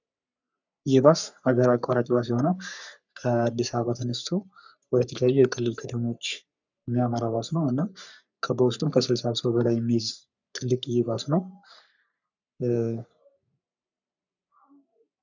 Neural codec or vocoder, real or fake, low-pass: codec, 44.1 kHz, 3.4 kbps, Pupu-Codec; fake; 7.2 kHz